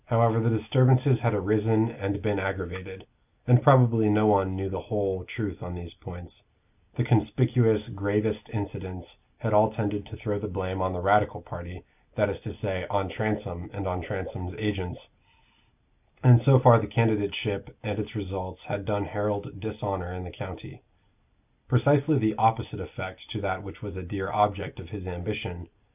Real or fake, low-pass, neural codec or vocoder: real; 3.6 kHz; none